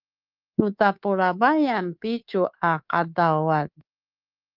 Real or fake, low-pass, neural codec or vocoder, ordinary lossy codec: fake; 5.4 kHz; codec, 24 kHz, 3.1 kbps, DualCodec; Opus, 32 kbps